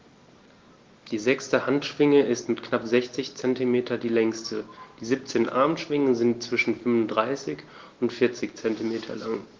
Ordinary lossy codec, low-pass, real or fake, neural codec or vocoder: Opus, 16 kbps; 7.2 kHz; real; none